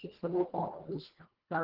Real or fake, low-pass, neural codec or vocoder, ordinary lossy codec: fake; 5.4 kHz; codec, 24 kHz, 1.5 kbps, HILCodec; Opus, 16 kbps